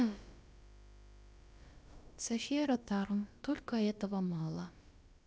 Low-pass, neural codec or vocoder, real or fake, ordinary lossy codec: none; codec, 16 kHz, about 1 kbps, DyCAST, with the encoder's durations; fake; none